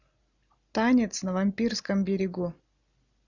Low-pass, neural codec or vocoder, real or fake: 7.2 kHz; none; real